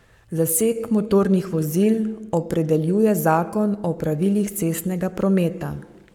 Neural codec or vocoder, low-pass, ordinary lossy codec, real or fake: codec, 44.1 kHz, 7.8 kbps, Pupu-Codec; 19.8 kHz; none; fake